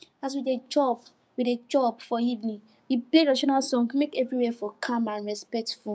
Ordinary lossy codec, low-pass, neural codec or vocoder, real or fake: none; none; codec, 16 kHz, 6 kbps, DAC; fake